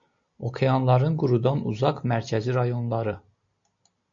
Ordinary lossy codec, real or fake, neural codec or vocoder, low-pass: MP3, 48 kbps; real; none; 7.2 kHz